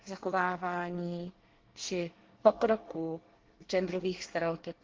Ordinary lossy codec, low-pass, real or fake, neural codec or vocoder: Opus, 16 kbps; 7.2 kHz; fake; codec, 24 kHz, 1 kbps, SNAC